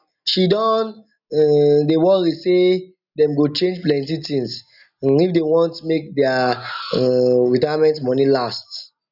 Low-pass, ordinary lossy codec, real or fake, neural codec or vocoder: 5.4 kHz; none; real; none